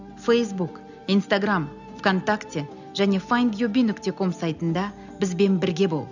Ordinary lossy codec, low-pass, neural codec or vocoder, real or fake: none; 7.2 kHz; none; real